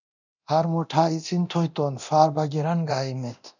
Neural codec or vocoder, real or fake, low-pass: codec, 24 kHz, 0.9 kbps, DualCodec; fake; 7.2 kHz